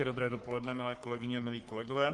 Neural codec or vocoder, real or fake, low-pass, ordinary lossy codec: codec, 44.1 kHz, 3.4 kbps, Pupu-Codec; fake; 10.8 kHz; Opus, 24 kbps